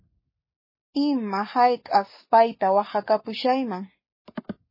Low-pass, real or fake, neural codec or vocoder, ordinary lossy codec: 5.4 kHz; fake; codec, 44.1 kHz, 7.8 kbps, Pupu-Codec; MP3, 24 kbps